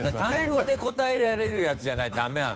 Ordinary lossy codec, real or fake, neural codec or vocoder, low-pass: none; fake; codec, 16 kHz, 2 kbps, FunCodec, trained on Chinese and English, 25 frames a second; none